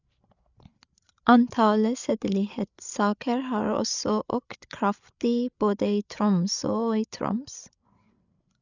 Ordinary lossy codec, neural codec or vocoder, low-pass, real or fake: none; codec, 16 kHz, 8 kbps, FreqCodec, larger model; 7.2 kHz; fake